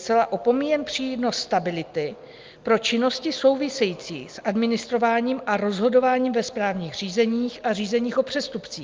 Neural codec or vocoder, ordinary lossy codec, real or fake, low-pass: none; Opus, 24 kbps; real; 7.2 kHz